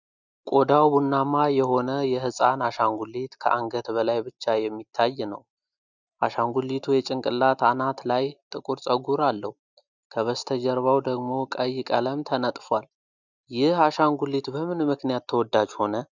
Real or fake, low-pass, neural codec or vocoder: real; 7.2 kHz; none